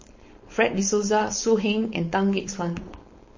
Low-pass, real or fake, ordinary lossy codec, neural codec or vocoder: 7.2 kHz; fake; MP3, 32 kbps; codec, 16 kHz, 4.8 kbps, FACodec